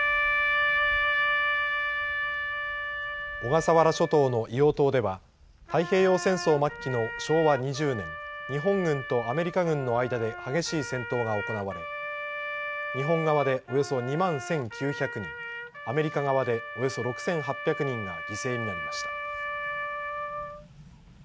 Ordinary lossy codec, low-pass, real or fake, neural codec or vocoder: none; none; real; none